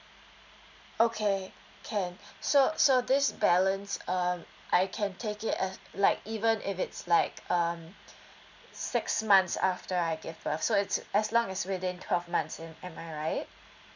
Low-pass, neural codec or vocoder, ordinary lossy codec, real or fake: 7.2 kHz; none; none; real